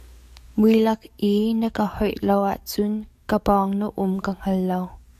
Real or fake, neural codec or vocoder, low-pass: fake; codec, 44.1 kHz, 7.8 kbps, DAC; 14.4 kHz